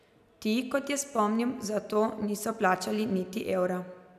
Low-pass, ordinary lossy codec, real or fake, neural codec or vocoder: 14.4 kHz; none; real; none